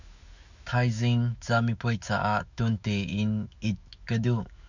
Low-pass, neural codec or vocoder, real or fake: 7.2 kHz; autoencoder, 48 kHz, 128 numbers a frame, DAC-VAE, trained on Japanese speech; fake